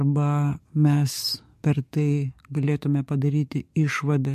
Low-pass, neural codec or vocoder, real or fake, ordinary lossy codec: 14.4 kHz; codec, 44.1 kHz, 7.8 kbps, DAC; fake; MP3, 64 kbps